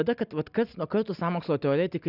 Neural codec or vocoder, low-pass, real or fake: none; 5.4 kHz; real